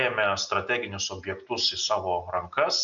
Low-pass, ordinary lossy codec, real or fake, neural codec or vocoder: 7.2 kHz; MP3, 96 kbps; real; none